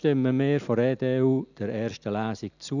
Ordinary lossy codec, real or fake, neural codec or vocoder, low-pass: none; real; none; 7.2 kHz